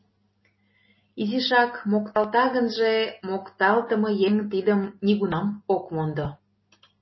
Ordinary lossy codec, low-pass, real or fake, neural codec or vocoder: MP3, 24 kbps; 7.2 kHz; real; none